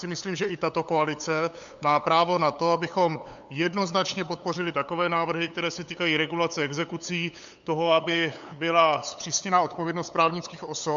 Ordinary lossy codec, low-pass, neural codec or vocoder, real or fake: MP3, 64 kbps; 7.2 kHz; codec, 16 kHz, 8 kbps, FunCodec, trained on LibriTTS, 25 frames a second; fake